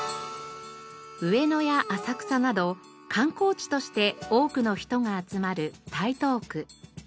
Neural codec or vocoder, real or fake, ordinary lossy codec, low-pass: none; real; none; none